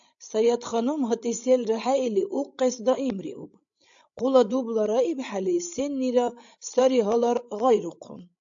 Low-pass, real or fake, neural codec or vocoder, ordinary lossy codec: 7.2 kHz; fake; codec, 16 kHz, 16 kbps, FreqCodec, larger model; AAC, 64 kbps